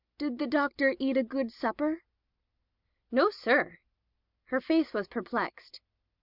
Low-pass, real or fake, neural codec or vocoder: 5.4 kHz; real; none